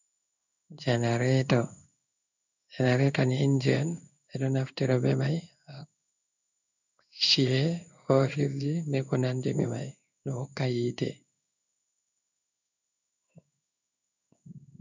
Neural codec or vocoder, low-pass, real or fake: codec, 16 kHz in and 24 kHz out, 1 kbps, XY-Tokenizer; 7.2 kHz; fake